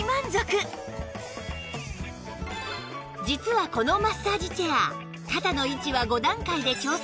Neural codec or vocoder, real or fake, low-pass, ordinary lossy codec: none; real; none; none